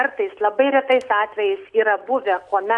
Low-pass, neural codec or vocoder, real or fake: 10.8 kHz; none; real